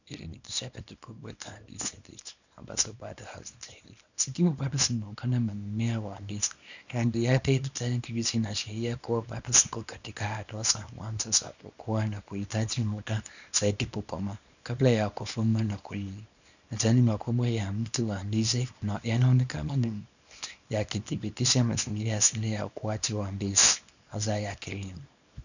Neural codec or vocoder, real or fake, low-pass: codec, 24 kHz, 0.9 kbps, WavTokenizer, small release; fake; 7.2 kHz